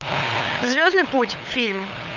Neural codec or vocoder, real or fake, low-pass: codec, 16 kHz, 8 kbps, FunCodec, trained on LibriTTS, 25 frames a second; fake; 7.2 kHz